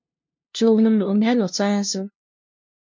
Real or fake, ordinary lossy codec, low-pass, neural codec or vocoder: fake; MP3, 64 kbps; 7.2 kHz; codec, 16 kHz, 0.5 kbps, FunCodec, trained on LibriTTS, 25 frames a second